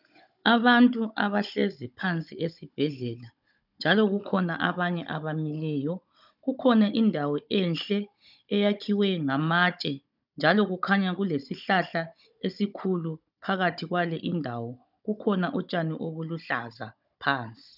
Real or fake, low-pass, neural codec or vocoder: fake; 5.4 kHz; codec, 16 kHz, 16 kbps, FunCodec, trained on Chinese and English, 50 frames a second